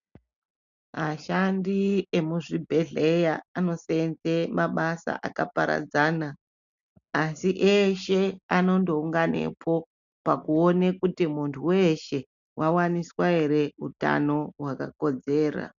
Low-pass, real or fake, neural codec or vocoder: 7.2 kHz; real; none